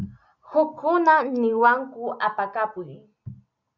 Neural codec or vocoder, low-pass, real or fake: none; 7.2 kHz; real